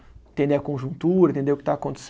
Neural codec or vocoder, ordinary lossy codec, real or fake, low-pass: none; none; real; none